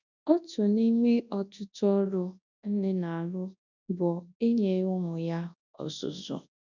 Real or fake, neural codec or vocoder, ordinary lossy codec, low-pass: fake; codec, 24 kHz, 0.9 kbps, WavTokenizer, large speech release; none; 7.2 kHz